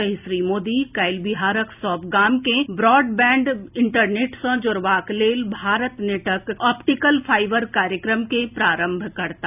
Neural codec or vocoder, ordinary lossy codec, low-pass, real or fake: none; none; 3.6 kHz; real